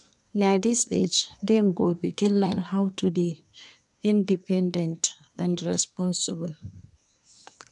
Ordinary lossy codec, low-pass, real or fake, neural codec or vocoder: none; 10.8 kHz; fake; codec, 24 kHz, 1 kbps, SNAC